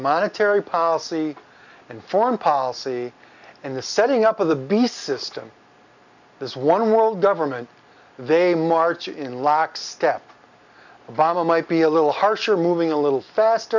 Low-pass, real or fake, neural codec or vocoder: 7.2 kHz; real; none